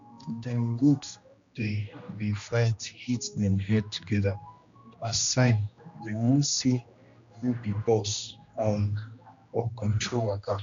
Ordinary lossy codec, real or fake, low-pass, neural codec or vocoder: MP3, 64 kbps; fake; 7.2 kHz; codec, 16 kHz, 1 kbps, X-Codec, HuBERT features, trained on general audio